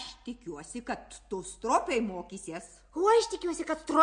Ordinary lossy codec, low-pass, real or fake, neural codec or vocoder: MP3, 48 kbps; 9.9 kHz; real; none